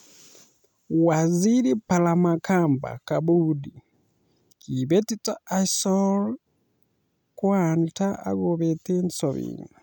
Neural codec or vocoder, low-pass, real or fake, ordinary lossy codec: none; none; real; none